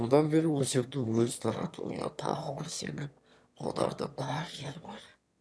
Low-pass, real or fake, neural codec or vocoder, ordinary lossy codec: none; fake; autoencoder, 22.05 kHz, a latent of 192 numbers a frame, VITS, trained on one speaker; none